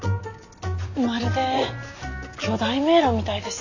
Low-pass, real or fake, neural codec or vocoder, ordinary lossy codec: 7.2 kHz; real; none; none